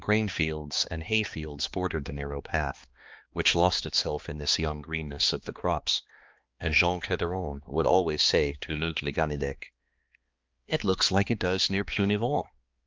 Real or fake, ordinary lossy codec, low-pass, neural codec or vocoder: fake; Opus, 32 kbps; 7.2 kHz; codec, 16 kHz, 2 kbps, X-Codec, HuBERT features, trained on balanced general audio